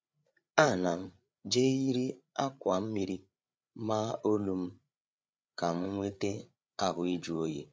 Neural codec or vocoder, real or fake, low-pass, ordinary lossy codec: codec, 16 kHz, 8 kbps, FreqCodec, larger model; fake; none; none